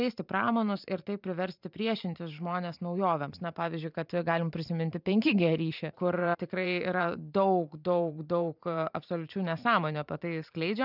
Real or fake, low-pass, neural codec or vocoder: fake; 5.4 kHz; vocoder, 44.1 kHz, 128 mel bands every 512 samples, BigVGAN v2